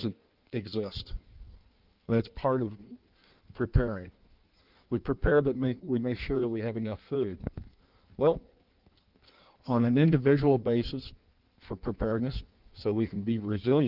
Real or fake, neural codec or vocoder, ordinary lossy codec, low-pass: fake; codec, 16 kHz in and 24 kHz out, 1.1 kbps, FireRedTTS-2 codec; Opus, 24 kbps; 5.4 kHz